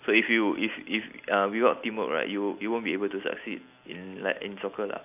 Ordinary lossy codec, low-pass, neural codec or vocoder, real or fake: AAC, 32 kbps; 3.6 kHz; none; real